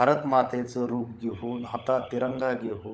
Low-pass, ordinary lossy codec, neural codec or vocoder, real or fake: none; none; codec, 16 kHz, 16 kbps, FunCodec, trained on LibriTTS, 50 frames a second; fake